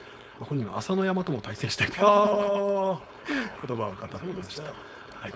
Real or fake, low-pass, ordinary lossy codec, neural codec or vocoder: fake; none; none; codec, 16 kHz, 4.8 kbps, FACodec